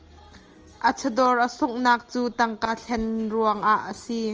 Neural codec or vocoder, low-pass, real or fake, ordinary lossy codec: none; 7.2 kHz; real; Opus, 24 kbps